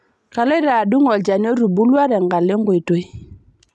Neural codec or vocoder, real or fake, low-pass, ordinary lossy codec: none; real; none; none